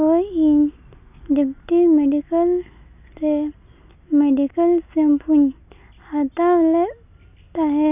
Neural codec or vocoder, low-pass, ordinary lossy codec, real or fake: none; 3.6 kHz; none; real